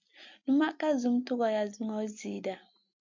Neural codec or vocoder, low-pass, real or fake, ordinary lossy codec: none; 7.2 kHz; real; MP3, 64 kbps